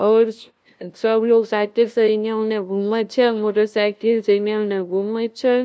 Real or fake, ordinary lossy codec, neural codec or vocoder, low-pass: fake; none; codec, 16 kHz, 0.5 kbps, FunCodec, trained on LibriTTS, 25 frames a second; none